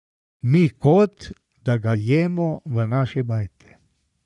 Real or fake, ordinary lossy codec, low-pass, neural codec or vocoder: fake; none; 10.8 kHz; codec, 44.1 kHz, 3.4 kbps, Pupu-Codec